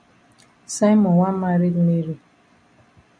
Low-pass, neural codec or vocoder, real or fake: 9.9 kHz; none; real